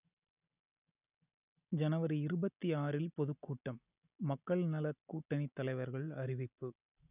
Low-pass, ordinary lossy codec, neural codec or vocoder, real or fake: 3.6 kHz; none; none; real